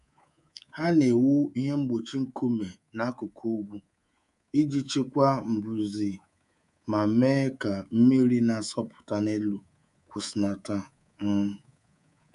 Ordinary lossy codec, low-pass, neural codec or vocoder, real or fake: MP3, 96 kbps; 10.8 kHz; codec, 24 kHz, 3.1 kbps, DualCodec; fake